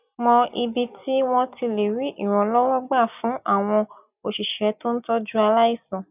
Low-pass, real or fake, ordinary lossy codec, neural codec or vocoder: 3.6 kHz; real; none; none